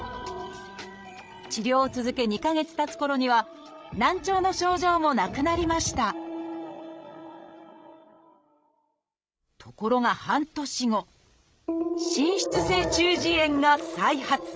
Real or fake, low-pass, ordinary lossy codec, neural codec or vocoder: fake; none; none; codec, 16 kHz, 16 kbps, FreqCodec, larger model